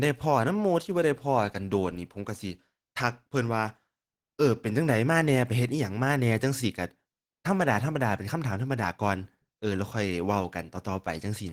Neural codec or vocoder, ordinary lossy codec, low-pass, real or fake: none; Opus, 16 kbps; 19.8 kHz; real